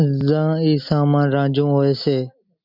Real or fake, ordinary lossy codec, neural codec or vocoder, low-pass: real; MP3, 48 kbps; none; 5.4 kHz